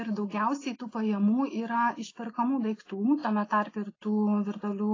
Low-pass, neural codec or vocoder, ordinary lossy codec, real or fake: 7.2 kHz; none; AAC, 32 kbps; real